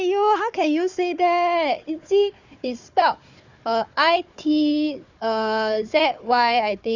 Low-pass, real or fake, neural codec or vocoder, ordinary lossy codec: 7.2 kHz; fake; codec, 16 kHz, 4 kbps, FunCodec, trained on Chinese and English, 50 frames a second; Opus, 64 kbps